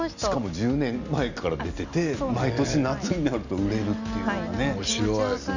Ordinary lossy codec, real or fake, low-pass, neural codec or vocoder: none; real; 7.2 kHz; none